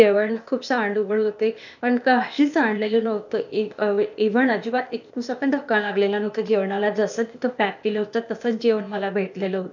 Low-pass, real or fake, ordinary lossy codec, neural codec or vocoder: 7.2 kHz; fake; none; codec, 16 kHz, 0.8 kbps, ZipCodec